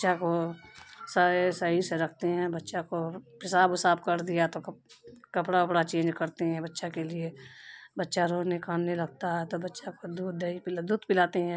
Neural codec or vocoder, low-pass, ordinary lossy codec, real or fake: none; none; none; real